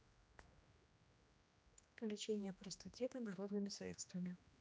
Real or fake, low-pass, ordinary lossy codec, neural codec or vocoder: fake; none; none; codec, 16 kHz, 1 kbps, X-Codec, HuBERT features, trained on general audio